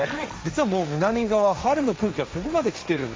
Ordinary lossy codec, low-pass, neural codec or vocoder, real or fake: none; none; codec, 16 kHz, 1.1 kbps, Voila-Tokenizer; fake